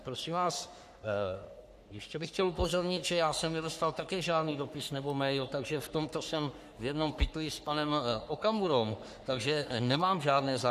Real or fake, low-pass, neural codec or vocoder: fake; 14.4 kHz; codec, 44.1 kHz, 3.4 kbps, Pupu-Codec